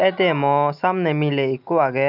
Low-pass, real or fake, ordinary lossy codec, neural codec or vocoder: 5.4 kHz; real; none; none